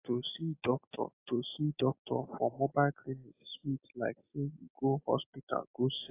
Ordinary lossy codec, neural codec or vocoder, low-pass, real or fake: AAC, 24 kbps; none; 3.6 kHz; real